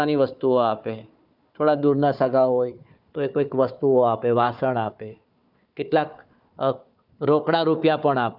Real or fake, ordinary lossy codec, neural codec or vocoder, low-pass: fake; none; codec, 16 kHz, 4 kbps, FunCodec, trained on Chinese and English, 50 frames a second; 5.4 kHz